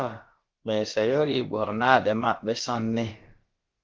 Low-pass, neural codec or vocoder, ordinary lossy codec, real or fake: 7.2 kHz; codec, 16 kHz, about 1 kbps, DyCAST, with the encoder's durations; Opus, 16 kbps; fake